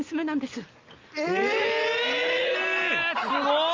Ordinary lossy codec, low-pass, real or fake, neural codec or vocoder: Opus, 24 kbps; 7.2 kHz; real; none